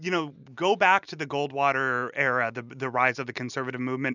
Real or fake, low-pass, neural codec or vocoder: real; 7.2 kHz; none